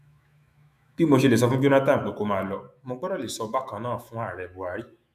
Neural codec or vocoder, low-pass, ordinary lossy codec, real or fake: autoencoder, 48 kHz, 128 numbers a frame, DAC-VAE, trained on Japanese speech; 14.4 kHz; none; fake